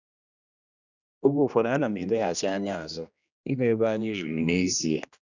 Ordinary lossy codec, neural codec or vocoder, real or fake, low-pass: none; codec, 16 kHz, 1 kbps, X-Codec, HuBERT features, trained on general audio; fake; 7.2 kHz